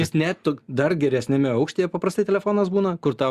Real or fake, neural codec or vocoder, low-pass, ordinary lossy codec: real; none; 14.4 kHz; Opus, 64 kbps